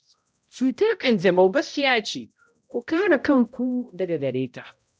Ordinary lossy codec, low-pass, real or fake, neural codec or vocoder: none; none; fake; codec, 16 kHz, 0.5 kbps, X-Codec, HuBERT features, trained on balanced general audio